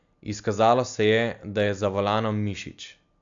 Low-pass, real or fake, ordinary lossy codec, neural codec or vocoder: 7.2 kHz; real; AAC, 64 kbps; none